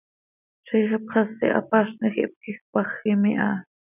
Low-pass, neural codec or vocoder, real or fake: 3.6 kHz; none; real